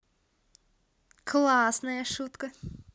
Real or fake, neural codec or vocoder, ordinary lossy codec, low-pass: real; none; none; none